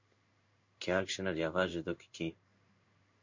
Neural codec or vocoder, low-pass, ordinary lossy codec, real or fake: codec, 16 kHz in and 24 kHz out, 1 kbps, XY-Tokenizer; 7.2 kHz; MP3, 48 kbps; fake